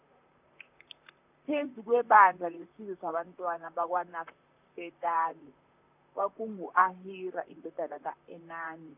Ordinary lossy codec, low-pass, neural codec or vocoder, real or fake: none; 3.6 kHz; vocoder, 44.1 kHz, 128 mel bands, Pupu-Vocoder; fake